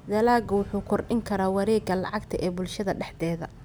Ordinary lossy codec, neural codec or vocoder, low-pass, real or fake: none; none; none; real